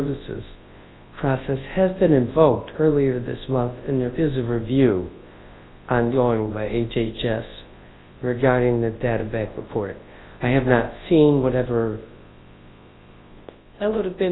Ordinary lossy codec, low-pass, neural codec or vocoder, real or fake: AAC, 16 kbps; 7.2 kHz; codec, 24 kHz, 0.9 kbps, WavTokenizer, large speech release; fake